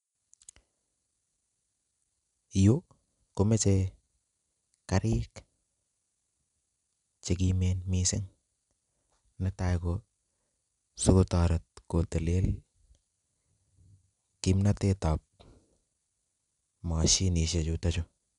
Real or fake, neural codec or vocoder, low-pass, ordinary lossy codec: real; none; 10.8 kHz; none